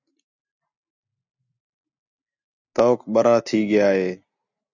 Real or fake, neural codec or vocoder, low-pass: real; none; 7.2 kHz